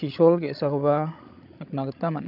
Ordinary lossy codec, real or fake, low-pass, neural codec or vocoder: none; fake; 5.4 kHz; codec, 16 kHz, 16 kbps, FreqCodec, larger model